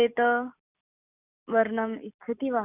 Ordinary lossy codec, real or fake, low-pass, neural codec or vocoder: none; real; 3.6 kHz; none